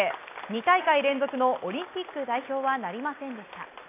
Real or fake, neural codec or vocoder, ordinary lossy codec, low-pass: real; none; AAC, 24 kbps; 3.6 kHz